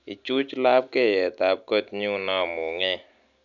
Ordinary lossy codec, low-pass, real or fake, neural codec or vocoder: none; 7.2 kHz; real; none